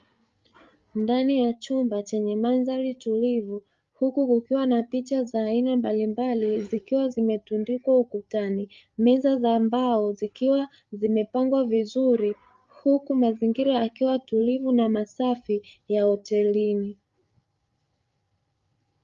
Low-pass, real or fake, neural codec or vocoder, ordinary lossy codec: 7.2 kHz; fake; codec, 16 kHz, 8 kbps, FreqCodec, larger model; Opus, 32 kbps